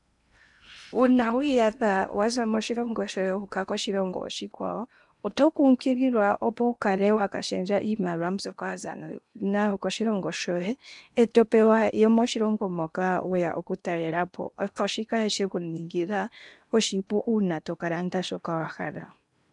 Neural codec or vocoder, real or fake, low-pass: codec, 16 kHz in and 24 kHz out, 0.8 kbps, FocalCodec, streaming, 65536 codes; fake; 10.8 kHz